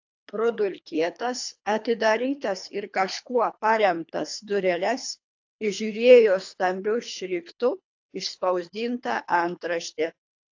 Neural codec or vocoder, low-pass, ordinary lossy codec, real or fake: codec, 24 kHz, 3 kbps, HILCodec; 7.2 kHz; AAC, 48 kbps; fake